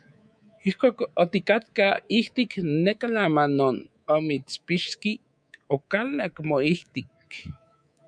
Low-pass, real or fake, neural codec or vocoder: 9.9 kHz; fake; codec, 24 kHz, 3.1 kbps, DualCodec